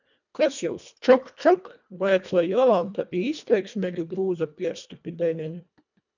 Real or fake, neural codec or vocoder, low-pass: fake; codec, 24 kHz, 1.5 kbps, HILCodec; 7.2 kHz